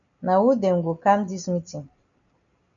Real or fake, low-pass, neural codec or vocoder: real; 7.2 kHz; none